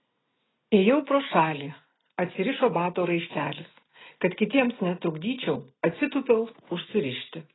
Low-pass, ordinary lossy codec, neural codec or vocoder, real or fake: 7.2 kHz; AAC, 16 kbps; none; real